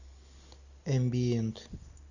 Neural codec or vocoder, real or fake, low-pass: none; real; 7.2 kHz